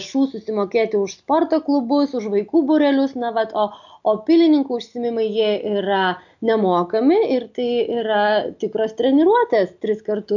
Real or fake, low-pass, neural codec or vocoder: real; 7.2 kHz; none